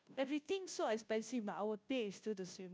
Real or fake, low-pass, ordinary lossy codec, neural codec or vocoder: fake; none; none; codec, 16 kHz, 0.5 kbps, FunCodec, trained on Chinese and English, 25 frames a second